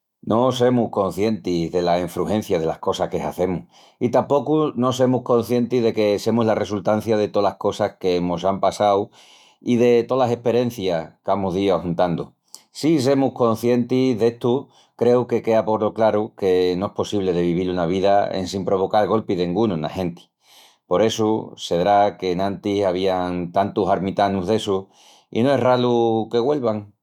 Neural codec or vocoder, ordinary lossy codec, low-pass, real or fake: autoencoder, 48 kHz, 128 numbers a frame, DAC-VAE, trained on Japanese speech; none; 19.8 kHz; fake